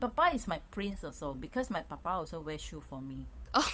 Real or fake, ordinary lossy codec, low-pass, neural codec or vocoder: fake; none; none; codec, 16 kHz, 8 kbps, FunCodec, trained on Chinese and English, 25 frames a second